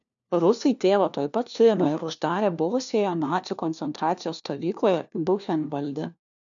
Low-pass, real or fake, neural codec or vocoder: 7.2 kHz; fake; codec, 16 kHz, 1 kbps, FunCodec, trained on LibriTTS, 50 frames a second